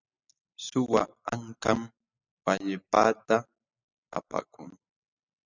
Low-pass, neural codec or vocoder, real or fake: 7.2 kHz; none; real